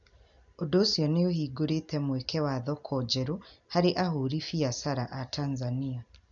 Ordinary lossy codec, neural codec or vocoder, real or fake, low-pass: none; none; real; 7.2 kHz